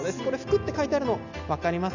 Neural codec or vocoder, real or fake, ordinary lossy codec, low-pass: none; real; none; 7.2 kHz